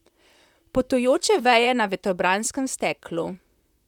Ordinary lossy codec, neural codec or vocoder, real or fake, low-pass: none; vocoder, 44.1 kHz, 128 mel bands, Pupu-Vocoder; fake; 19.8 kHz